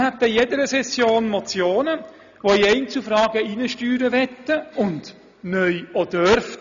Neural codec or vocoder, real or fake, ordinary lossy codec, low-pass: none; real; none; 7.2 kHz